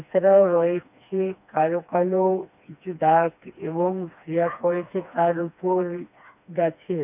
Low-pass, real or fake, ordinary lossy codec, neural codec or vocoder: 3.6 kHz; fake; none; codec, 16 kHz, 2 kbps, FreqCodec, smaller model